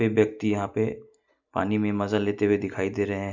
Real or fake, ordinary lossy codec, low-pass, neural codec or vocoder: real; none; 7.2 kHz; none